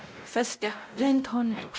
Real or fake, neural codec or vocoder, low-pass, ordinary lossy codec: fake; codec, 16 kHz, 0.5 kbps, X-Codec, WavLM features, trained on Multilingual LibriSpeech; none; none